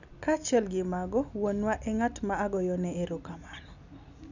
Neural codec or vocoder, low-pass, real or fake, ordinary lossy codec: none; 7.2 kHz; real; none